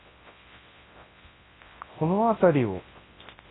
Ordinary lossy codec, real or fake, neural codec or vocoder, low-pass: AAC, 16 kbps; fake; codec, 24 kHz, 0.9 kbps, WavTokenizer, large speech release; 7.2 kHz